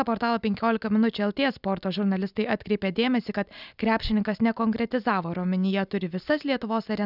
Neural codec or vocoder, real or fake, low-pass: none; real; 5.4 kHz